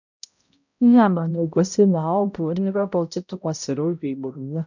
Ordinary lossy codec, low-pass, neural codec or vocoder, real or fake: none; 7.2 kHz; codec, 16 kHz, 0.5 kbps, X-Codec, HuBERT features, trained on balanced general audio; fake